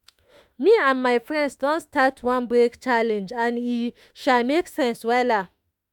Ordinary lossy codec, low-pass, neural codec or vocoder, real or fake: none; 19.8 kHz; autoencoder, 48 kHz, 32 numbers a frame, DAC-VAE, trained on Japanese speech; fake